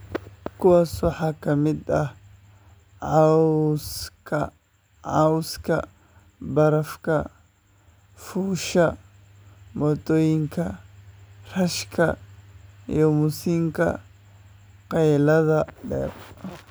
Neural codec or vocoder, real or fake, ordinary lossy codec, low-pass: none; real; none; none